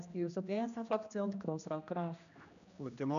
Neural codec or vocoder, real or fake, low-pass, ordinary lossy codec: codec, 16 kHz, 1 kbps, X-Codec, HuBERT features, trained on general audio; fake; 7.2 kHz; none